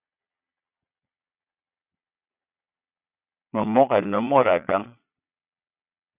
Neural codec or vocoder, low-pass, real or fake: vocoder, 22.05 kHz, 80 mel bands, Vocos; 3.6 kHz; fake